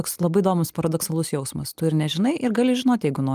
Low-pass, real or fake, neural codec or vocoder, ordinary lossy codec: 14.4 kHz; real; none; Opus, 32 kbps